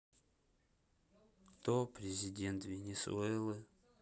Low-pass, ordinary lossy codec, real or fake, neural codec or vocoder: none; none; real; none